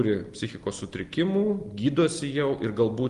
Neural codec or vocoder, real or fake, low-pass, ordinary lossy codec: none; real; 10.8 kHz; Opus, 24 kbps